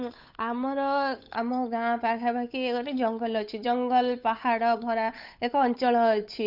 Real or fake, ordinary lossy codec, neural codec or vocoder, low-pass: fake; Opus, 64 kbps; codec, 16 kHz, 8 kbps, FunCodec, trained on Chinese and English, 25 frames a second; 5.4 kHz